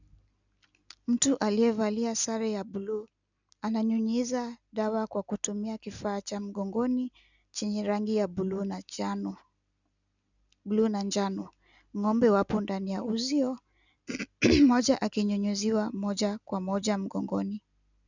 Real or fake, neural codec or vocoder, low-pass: real; none; 7.2 kHz